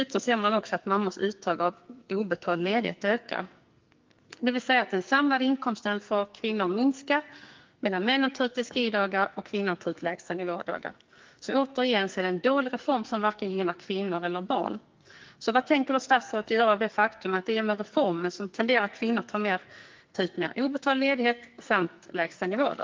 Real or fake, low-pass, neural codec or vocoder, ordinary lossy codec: fake; 7.2 kHz; codec, 44.1 kHz, 2.6 kbps, SNAC; Opus, 24 kbps